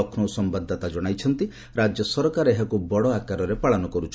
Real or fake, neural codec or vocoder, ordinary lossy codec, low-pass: real; none; none; none